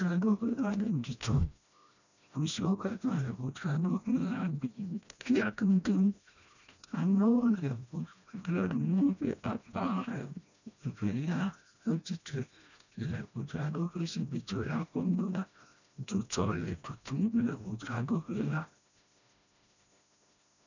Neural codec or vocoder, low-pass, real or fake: codec, 16 kHz, 1 kbps, FreqCodec, smaller model; 7.2 kHz; fake